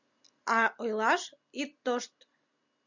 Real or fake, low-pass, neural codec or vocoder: real; 7.2 kHz; none